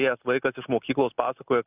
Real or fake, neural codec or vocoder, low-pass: real; none; 3.6 kHz